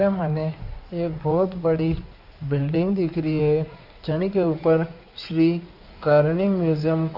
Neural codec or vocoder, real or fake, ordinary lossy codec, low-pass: codec, 16 kHz in and 24 kHz out, 2.2 kbps, FireRedTTS-2 codec; fake; none; 5.4 kHz